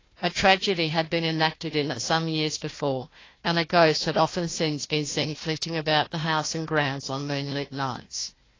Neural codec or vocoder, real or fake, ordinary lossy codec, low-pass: codec, 16 kHz, 1 kbps, FunCodec, trained on Chinese and English, 50 frames a second; fake; AAC, 32 kbps; 7.2 kHz